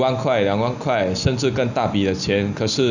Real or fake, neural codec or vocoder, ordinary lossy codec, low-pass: real; none; none; 7.2 kHz